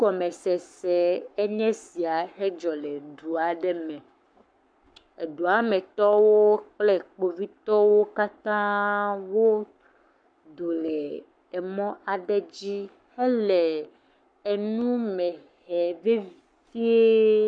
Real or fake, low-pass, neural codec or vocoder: fake; 9.9 kHz; codec, 44.1 kHz, 7.8 kbps, Pupu-Codec